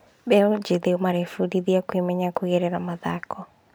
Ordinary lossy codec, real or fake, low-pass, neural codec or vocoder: none; real; none; none